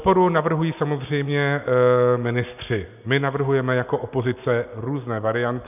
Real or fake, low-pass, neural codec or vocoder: real; 3.6 kHz; none